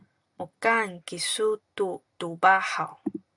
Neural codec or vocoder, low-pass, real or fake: none; 10.8 kHz; real